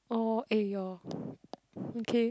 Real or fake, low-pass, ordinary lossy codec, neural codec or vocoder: real; none; none; none